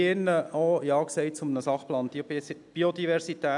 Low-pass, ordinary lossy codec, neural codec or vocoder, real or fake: 10.8 kHz; none; none; real